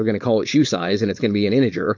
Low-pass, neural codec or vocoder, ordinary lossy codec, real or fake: 7.2 kHz; codec, 16 kHz, 4.8 kbps, FACodec; MP3, 48 kbps; fake